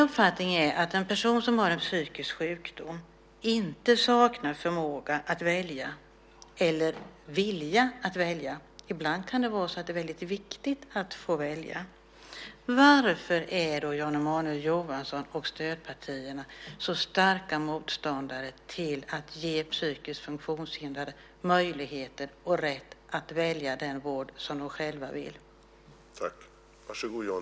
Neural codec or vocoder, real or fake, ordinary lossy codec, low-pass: none; real; none; none